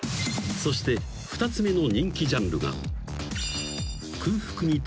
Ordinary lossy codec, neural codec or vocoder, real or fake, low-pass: none; none; real; none